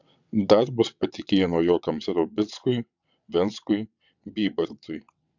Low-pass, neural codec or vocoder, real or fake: 7.2 kHz; vocoder, 22.05 kHz, 80 mel bands, WaveNeXt; fake